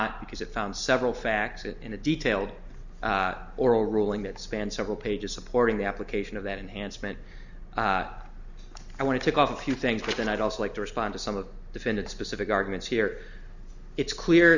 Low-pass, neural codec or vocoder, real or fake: 7.2 kHz; none; real